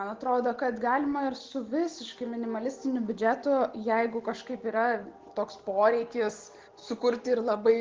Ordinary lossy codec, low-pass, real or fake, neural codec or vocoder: Opus, 16 kbps; 7.2 kHz; real; none